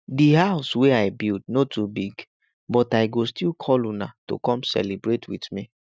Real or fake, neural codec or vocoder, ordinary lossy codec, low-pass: real; none; none; none